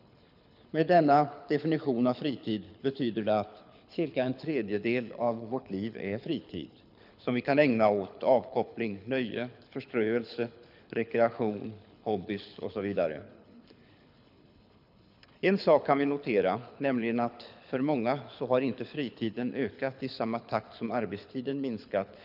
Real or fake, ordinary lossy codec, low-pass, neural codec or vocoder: fake; none; 5.4 kHz; codec, 24 kHz, 6 kbps, HILCodec